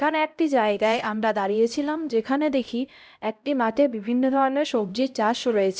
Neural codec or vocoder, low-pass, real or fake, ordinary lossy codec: codec, 16 kHz, 0.5 kbps, X-Codec, HuBERT features, trained on LibriSpeech; none; fake; none